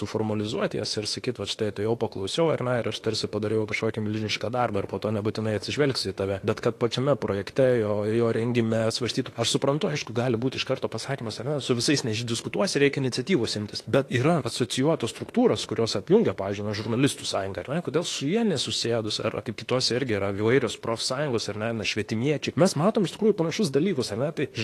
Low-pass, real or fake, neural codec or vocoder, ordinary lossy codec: 14.4 kHz; fake; autoencoder, 48 kHz, 32 numbers a frame, DAC-VAE, trained on Japanese speech; AAC, 48 kbps